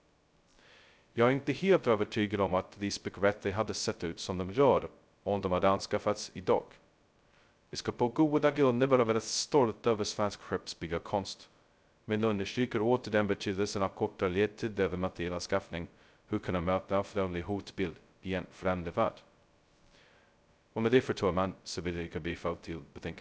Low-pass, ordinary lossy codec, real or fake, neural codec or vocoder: none; none; fake; codec, 16 kHz, 0.2 kbps, FocalCodec